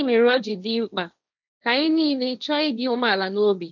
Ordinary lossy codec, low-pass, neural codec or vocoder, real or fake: none; 7.2 kHz; codec, 16 kHz, 1.1 kbps, Voila-Tokenizer; fake